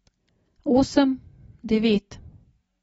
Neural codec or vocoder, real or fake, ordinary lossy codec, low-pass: none; real; AAC, 24 kbps; 19.8 kHz